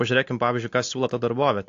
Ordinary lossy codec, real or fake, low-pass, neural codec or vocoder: AAC, 48 kbps; real; 7.2 kHz; none